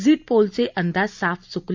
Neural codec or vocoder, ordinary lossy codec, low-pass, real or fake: none; MP3, 64 kbps; 7.2 kHz; real